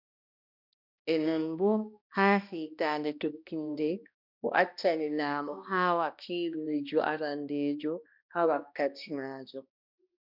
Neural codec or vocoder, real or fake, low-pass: codec, 16 kHz, 1 kbps, X-Codec, HuBERT features, trained on balanced general audio; fake; 5.4 kHz